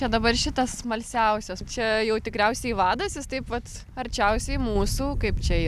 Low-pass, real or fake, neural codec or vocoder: 14.4 kHz; real; none